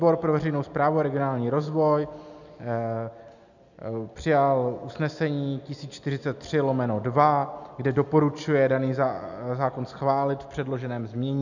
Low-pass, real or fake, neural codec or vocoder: 7.2 kHz; real; none